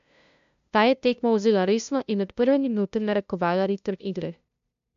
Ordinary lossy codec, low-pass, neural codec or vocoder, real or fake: MP3, 64 kbps; 7.2 kHz; codec, 16 kHz, 0.5 kbps, FunCodec, trained on LibriTTS, 25 frames a second; fake